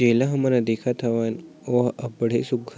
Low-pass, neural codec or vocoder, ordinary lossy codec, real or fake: none; none; none; real